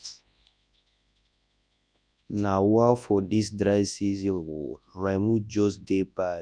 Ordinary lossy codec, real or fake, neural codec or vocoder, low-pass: none; fake; codec, 24 kHz, 0.9 kbps, WavTokenizer, large speech release; 9.9 kHz